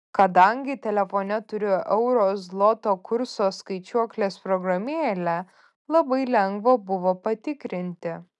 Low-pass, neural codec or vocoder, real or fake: 10.8 kHz; none; real